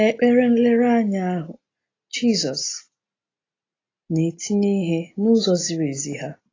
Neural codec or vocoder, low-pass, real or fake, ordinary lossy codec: none; 7.2 kHz; real; AAC, 32 kbps